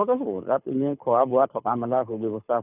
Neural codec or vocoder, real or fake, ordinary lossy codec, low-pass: codec, 24 kHz, 6 kbps, HILCodec; fake; none; 3.6 kHz